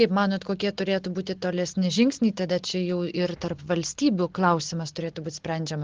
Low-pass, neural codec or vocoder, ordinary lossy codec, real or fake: 7.2 kHz; none; Opus, 16 kbps; real